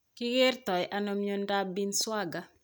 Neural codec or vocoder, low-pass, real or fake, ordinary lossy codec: none; none; real; none